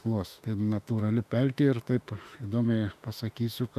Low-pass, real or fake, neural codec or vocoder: 14.4 kHz; fake; autoencoder, 48 kHz, 32 numbers a frame, DAC-VAE, trained on Japanese speech